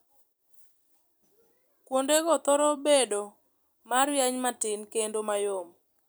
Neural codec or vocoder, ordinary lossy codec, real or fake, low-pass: vocoder, 44.1 kHz, 128 mel bands every 256 samples, BigVGAN v2; none; fake; none